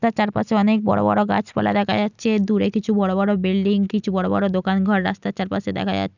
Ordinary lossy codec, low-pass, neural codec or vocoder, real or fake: none; 7.2 kHz; none; real